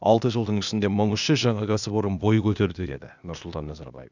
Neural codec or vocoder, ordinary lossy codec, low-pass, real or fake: codec, 16 kHz, 0.8 kbps, ZipCodec; none; 7.2 kHz; fake